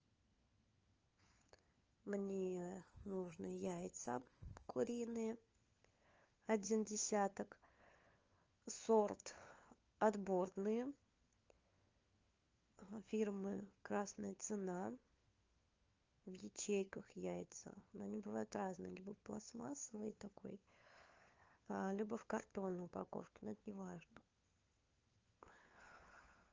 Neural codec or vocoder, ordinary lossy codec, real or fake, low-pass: codec, 16 kHz, 4 kbps, FunCodec, trained on LibriTTS, 50 frames a second; Opus, 24 kbps; fake; 7.2 kHz